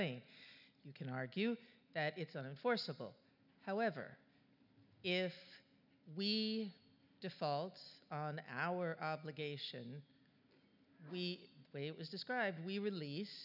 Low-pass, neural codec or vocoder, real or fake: 5.4 kHz; none; real